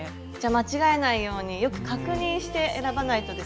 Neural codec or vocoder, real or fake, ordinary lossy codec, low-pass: none; real; none; none